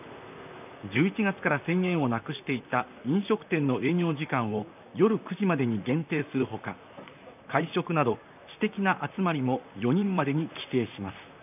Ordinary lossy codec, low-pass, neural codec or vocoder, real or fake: none; 3.6 kHz; vocoder, 44.1 kHz, 128 mel bands, Pupu-Vocoder; fake